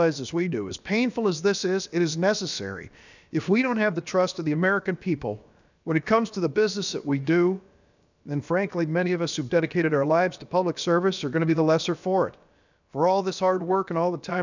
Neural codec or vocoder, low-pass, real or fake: codec, 16 kHz, about 1 kbps, DyCAST, with the encoder's durations; 7.2 kHz; fake